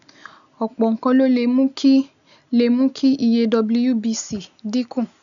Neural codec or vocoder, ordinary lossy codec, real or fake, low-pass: none; none; real; 7.2 kHz